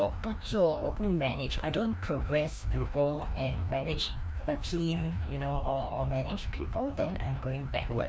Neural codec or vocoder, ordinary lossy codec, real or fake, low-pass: codec, 16 kHz, 1 kbps, FreqCodec, larger model; none; fake; none